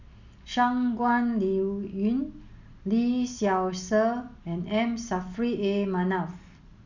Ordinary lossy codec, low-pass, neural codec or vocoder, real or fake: none; 7.2 kHz; none; real